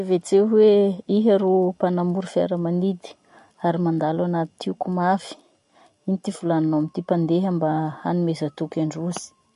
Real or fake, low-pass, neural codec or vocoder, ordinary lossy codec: real; 14.4 kHz; none; MP3, 48 kbps